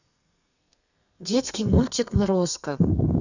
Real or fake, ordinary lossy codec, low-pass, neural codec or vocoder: fake; none; 7.2 kHz; codec, 32 kHz, 1.9 kbps, SNAC